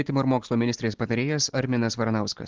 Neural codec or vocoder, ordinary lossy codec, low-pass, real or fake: none; Opus, 16 kbps; 7.2 kHz; real